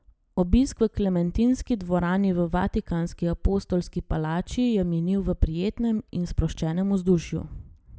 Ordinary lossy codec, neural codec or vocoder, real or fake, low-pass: none; none; real; none